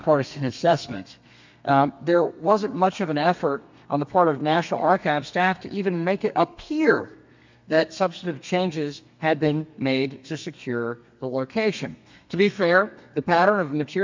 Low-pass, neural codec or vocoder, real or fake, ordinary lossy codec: 7.2 kHz; codec, 32 kHz, 1.9 kbps, SNAC; fake; MP3, 64 kbps